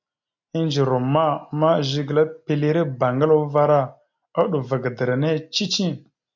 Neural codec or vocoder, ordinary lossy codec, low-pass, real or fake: none; MP3, 48 kbps; 7.2 kHz; real